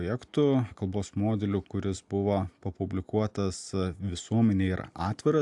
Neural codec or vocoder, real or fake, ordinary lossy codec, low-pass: none; real; AAC, 64 kbps; 10.8 kHz